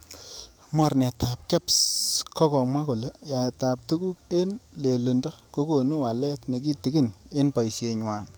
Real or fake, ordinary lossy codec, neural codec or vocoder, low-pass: fake; none; codec, 44.1 kHz, 7.8 kbps, DAC; none